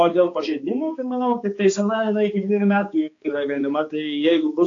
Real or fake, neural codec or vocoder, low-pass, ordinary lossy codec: fake; codec, 16 kHz, 2 kbps, X-Codec, HuBERT features, trained on balanced general audio; 7.2 kHz; AAC, 48 kbps